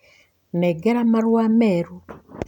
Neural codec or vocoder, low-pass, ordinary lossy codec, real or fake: none; 19.8 kHz; none; real